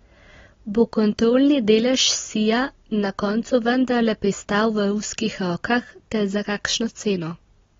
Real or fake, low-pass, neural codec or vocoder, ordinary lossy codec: real; 7.2 kHz; none; AAC, 24 kbps